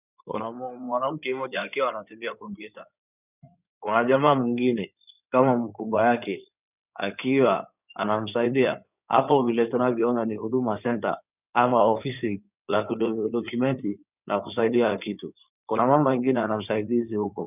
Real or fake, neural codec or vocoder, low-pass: fake; codec, 16 kHz in and 24 kHz out, 2.2 kbps, FireRedTTS-2 codec; 3.6 kHz